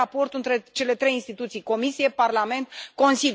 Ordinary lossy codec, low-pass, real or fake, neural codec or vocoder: none; none; real; none